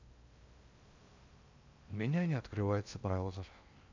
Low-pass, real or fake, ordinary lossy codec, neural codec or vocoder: 7.2 kHz; fake; MP3, 64 kbps; codec, 16 kHz in and 24 kHz out, 0.6 kbps, FocalCodec, streaming, 2048 codes